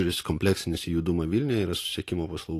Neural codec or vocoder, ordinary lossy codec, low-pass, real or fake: none; AAC, 48 kbps; 14.4 kHz; real